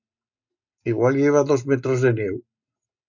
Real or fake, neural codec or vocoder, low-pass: real; none; 7.2 kHz